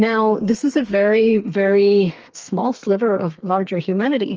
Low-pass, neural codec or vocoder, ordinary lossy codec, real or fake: 7.2 kHz; codec, 32 kHz, 1.9 kbps, SNAC; Opus, 24 kbps; fake